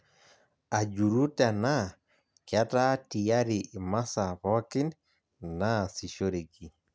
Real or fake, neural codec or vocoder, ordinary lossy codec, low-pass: real; none; none; none